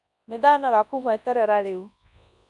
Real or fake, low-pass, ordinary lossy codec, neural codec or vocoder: fake; 10.8 kHz; none; codec, 24 kHz, 0.9 kbps, WavTokenizer, large speech release